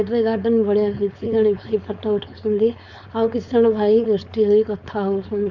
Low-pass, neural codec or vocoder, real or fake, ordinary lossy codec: 7.2 kHz; codec, 16 kHz, 4.8 kbps, FACodec; fake; none